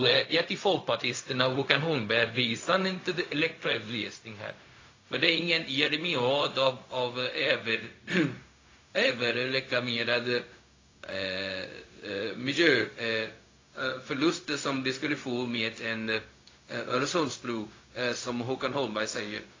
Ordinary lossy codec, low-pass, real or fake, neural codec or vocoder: AAC, 32 kbps; 7.2 kHz; fake; codec, 16 kHz, 0.4 kbps, LongCat-Audio-Codec